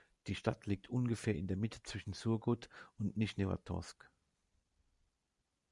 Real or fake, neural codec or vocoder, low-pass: real; none; 10.8 kHz